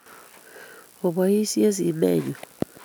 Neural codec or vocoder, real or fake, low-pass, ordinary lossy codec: none; real; none; none